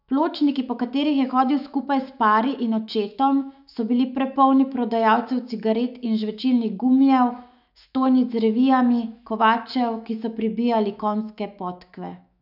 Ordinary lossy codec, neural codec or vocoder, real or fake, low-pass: none; autoencoder, 48 kHz, 128 numbers a frame, DAC-VAE, trained on Japanese speech; fake; 5.4 kHz